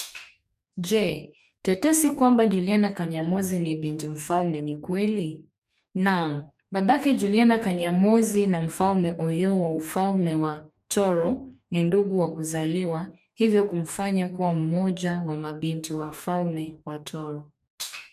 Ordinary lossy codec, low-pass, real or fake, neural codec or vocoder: none; 14.4 kHz; fake; codec, 44.1 kHz, 2.6 kbps, DAC